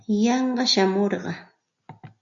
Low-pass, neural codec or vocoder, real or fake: 7.2 kHz; none; real